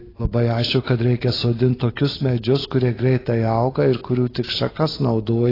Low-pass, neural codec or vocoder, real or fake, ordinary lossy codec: 5.4 kHz; none; real; AAC, 24 kbps